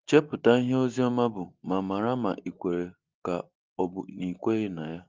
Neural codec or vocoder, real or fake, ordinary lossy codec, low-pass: none; real; Opus, 32 kbps; 7.2 kHz